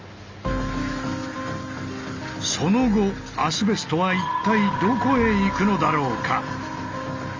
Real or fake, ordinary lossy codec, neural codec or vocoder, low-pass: real; Opus, 32 kbps; none; 7.2 kHz